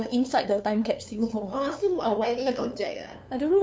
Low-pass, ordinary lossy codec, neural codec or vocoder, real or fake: none; none; codec, 16 kHz, 2 kbps, FunCodec, trained on LibriTTS, 25 frames a second; fake